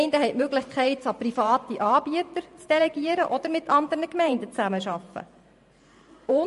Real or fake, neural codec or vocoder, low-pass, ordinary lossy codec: fake; vocoder, 44.1 kHz, 128 mel bands every 512 samples, BigVGAN v2; 14.4 kHz; MP3, 48 kbps